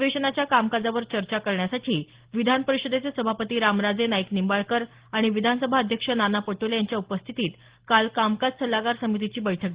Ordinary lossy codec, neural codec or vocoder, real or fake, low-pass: Opus, 16 kbps; none; real; 3.6 kHz